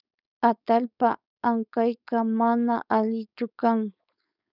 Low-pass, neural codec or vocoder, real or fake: 5.4 kHz; codec, 16 kHz, 4.8 kbps, FACodec; fake